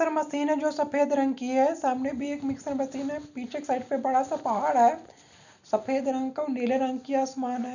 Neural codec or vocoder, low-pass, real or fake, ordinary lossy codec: none; 7.2 kHz; real; none